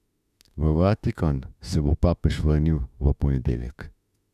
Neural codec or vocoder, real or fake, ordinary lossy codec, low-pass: autoencoder, 48 kHz, 32 numbers a frame, DAC-VAE, trained on Japanese speech; fake; none; 14.4 kHz